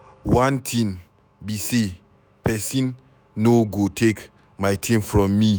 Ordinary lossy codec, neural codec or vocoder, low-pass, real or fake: none; autoencoder, 48 kHz, 128 numbers a frame, DAC-VAE, trained on Japanese speech; none; fake